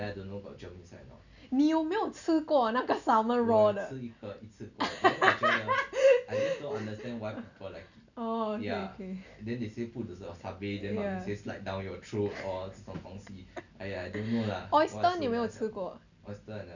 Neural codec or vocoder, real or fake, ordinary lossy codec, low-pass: none; real; none; 7.2 kHz